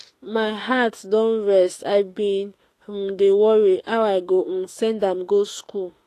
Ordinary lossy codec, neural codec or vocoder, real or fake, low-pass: AAC, 48 kbps; autoencoder, 48 kHz, 32 numbers a frame, DAC-VAE, trained on Japanese speech; fake; 14.4 kHz